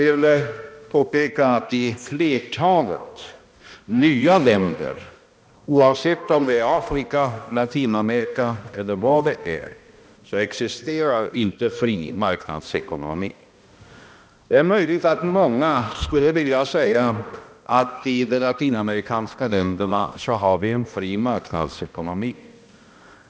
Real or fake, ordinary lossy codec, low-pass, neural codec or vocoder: fake; none; none; codec, 16 kHz, 1 kbps, X-Codec, HuBERT features, trained on balanced general audio